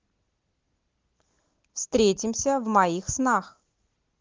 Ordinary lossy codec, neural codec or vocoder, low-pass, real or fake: Opus, 16 kbps; none; 7.2 kHz; real